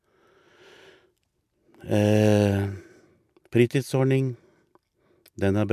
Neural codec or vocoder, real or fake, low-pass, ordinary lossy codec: none; real; 14.4 kHz; MP3, 96 kbps